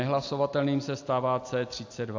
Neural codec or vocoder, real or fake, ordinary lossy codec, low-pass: none; real; AAC, 48 kbps; 7.2 kHz